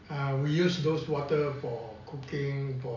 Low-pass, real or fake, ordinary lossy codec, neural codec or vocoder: 7.2 kHz; real; none; none